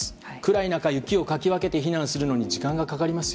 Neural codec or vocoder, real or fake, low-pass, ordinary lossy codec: none; real; none; none